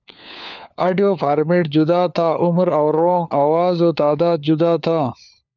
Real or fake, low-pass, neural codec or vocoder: fake; 7.2 kHz; codec, 16 kHz, 4 kbps, FunCodec, trained on LibriTTS, 50 frames a second